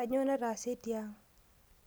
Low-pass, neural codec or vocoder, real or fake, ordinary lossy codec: none; none; real; none